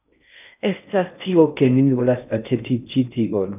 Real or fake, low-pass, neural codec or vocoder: fake; 3.6 kHz; codec, 16 kHz in and 24 kHz out, 0.6 kbps, FocalCodec, streaming, 4096 codes